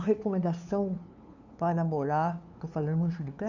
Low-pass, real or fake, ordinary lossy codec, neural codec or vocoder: 7.2 kHz; fake; none; codec, 16 kHz, 2 kbps, FunCodec, trained on LibriTTS, 25 frames a second